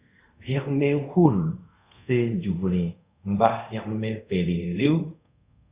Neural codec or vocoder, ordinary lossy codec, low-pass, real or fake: codec, 24 kHz, 0.5 kbps, DualCodec; Opus, 64 kbps; 3.6 kHz; fake